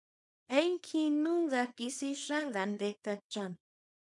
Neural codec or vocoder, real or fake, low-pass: codec, 24 kHz, 0.9 kbps, WavTokenizer, small release; fake; 10.8 kHz